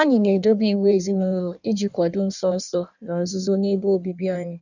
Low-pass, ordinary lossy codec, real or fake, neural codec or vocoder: 7.2 kHz; none; fake; codec, 16 kHz in and 24 kHz out, 1.1 kbps, FireRedTTS-2 codec